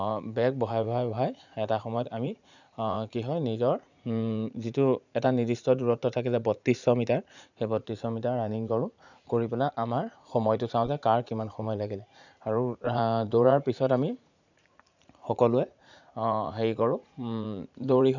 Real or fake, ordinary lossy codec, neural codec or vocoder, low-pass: fake; none; vocoder, 44.1 kHz, 80 mel bands, Vocos; 7.2 kHz